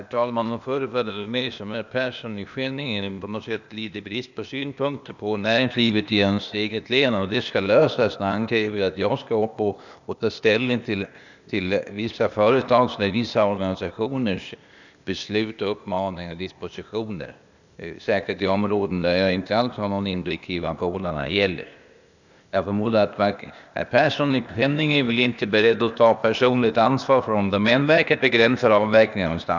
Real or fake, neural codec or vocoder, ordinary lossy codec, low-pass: fake; codec, 16 kHz, 0.8 kbps, ZipCodec; none; 7.2 kHz